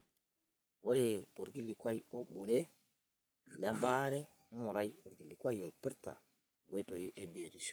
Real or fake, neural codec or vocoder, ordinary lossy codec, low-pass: fake; codec, 44.1 kHz, 3.4 kbps, Pupu-Codec; none; none